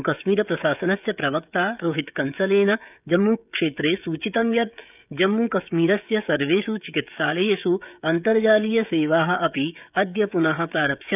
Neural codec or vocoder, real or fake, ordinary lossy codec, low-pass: codec, 16 kHz, 16 kbps, FreqCodec, smaller model; fake; none; 3.6 kHz